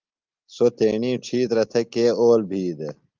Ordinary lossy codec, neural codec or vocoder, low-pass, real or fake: Opus, 32 kbps; none; 7.2 kHz; real